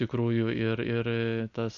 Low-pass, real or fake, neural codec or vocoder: 7.2 kHz; real; none